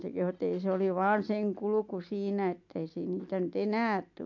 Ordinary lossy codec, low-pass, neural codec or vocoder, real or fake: MP3, 48 kbps; 7.2 kHz; none; real